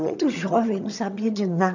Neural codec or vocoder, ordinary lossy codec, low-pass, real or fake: vocoder, 22.05 kHz, 80 mel bands, HiFi-GAN; none; 7.2 kHz; fake